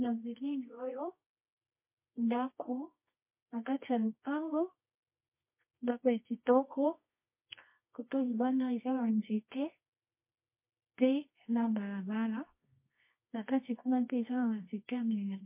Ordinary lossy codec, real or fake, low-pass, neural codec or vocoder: MP3, 24 kbps; fake; 3.6 kHz; codec, 24 kHz, 0.9 kbps, WavTokenizer, medium music audio release